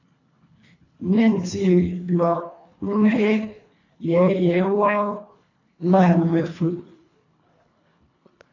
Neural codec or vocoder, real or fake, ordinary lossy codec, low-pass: codec, 24 kHz, 1.5 kbps, HILCodec; fake; AAC, 32 kbps; 7.2 kHz